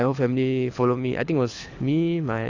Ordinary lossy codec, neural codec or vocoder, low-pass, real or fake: AAC, 48 kbps; codec, 24 kHz, 6 kbps, HILCodec; 7.2 kHz; fake